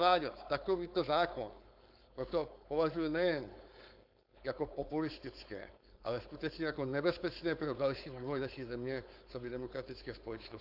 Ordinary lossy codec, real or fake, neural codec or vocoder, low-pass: AAC, 48 kbps; fake; codec, 16 kHz, 4.8 kbps, FACodec; 5.4 kHz